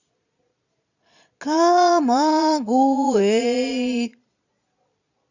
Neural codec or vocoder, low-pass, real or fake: vocoder, 22.05 kHz, 80 mel bands, Vocos; 7.2 kHz; fake